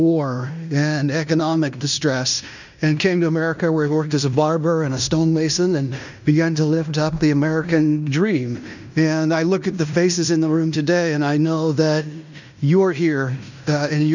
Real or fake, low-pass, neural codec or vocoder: fake; 7.2 kHz; codec, 16 kHz in and 24 kHz out, 0.9 kbps, LongCat-Audio-Codec, fine tuned four codebook decoder